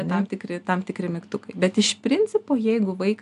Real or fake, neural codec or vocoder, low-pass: real; none; 10.8 kHz